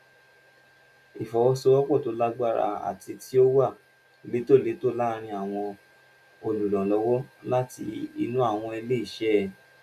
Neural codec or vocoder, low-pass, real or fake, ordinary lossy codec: none; 14.4 kHz; real; none